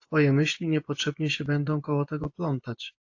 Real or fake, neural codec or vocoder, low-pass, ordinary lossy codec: fake; vocoder, 24 kHz, 100 mel bands, Vocos; 7.2 kHz; AAC, 48 kbps